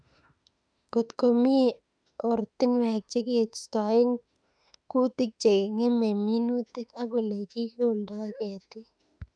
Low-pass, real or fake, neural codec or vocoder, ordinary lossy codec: 9.9 kHz; fake; autoencoder, 48 kHz, 32 numbers a frame, DAC-VAE, trained on Japanese speech; none